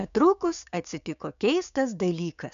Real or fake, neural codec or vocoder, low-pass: fake; codec, 16 kHz, 6 kbps, DAC; 7.2 kHz